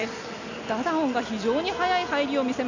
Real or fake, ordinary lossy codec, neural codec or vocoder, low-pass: real; none; none; 7.2 kHz